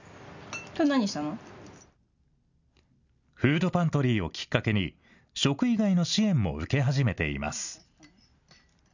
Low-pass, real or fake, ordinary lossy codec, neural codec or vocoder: 7.2 kHz; real; none; none